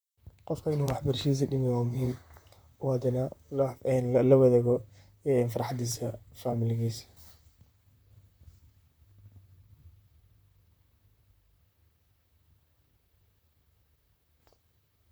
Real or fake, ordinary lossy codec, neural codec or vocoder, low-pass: fake; none; vocoder, 44.1 kHz, 128 mel bands, Pupu-Vocoder; none